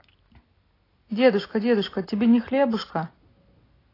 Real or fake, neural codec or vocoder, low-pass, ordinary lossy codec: real; none; 5.4 kHz; AAC, 24 kbps